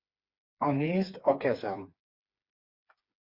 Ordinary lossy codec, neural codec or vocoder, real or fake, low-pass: Opus, 64 kbps; codec, 16 kHz, 4 kbps, FreqCodec, smaller model; fake; 5.4 kHz